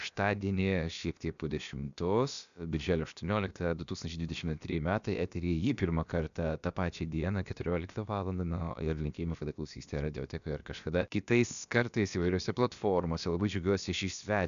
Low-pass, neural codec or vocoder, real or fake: 7.2 kHz; codec, 16 kHz, about 1 kbps, DyCAST, with the encoder's durations; fake